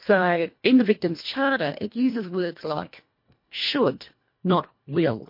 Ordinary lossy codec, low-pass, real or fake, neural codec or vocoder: MP3, 32 kbps; 5.4 kHz; fake; codec, 24 kHz, 1.5 kbps, HILCodec